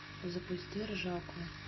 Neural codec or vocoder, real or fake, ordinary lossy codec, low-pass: none; real; MP3, 24 kbps; 7.2 kHz